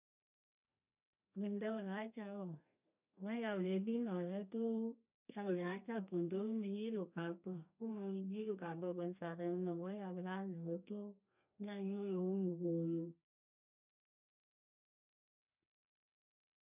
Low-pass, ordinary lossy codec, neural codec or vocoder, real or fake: 3.6 kHz; none; codec, 32 kHz, 1.9 kbps, SNAC; fake